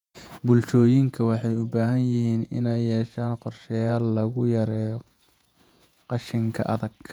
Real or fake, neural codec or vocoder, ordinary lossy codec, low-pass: fake; vocoder, 48 kHz, 128 mel bands, Vocos; none; 19.8 kHz